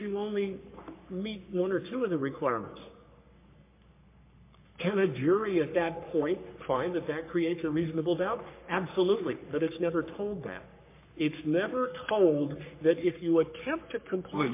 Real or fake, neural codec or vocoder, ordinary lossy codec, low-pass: fake; codec, 44.1 kHz, 3.4 kbps, Pupu-Codec; MP3, 24 kbps; 3.6 kHz